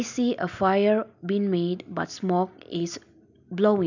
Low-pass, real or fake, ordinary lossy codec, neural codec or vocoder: 7.2 kHz; real; none; none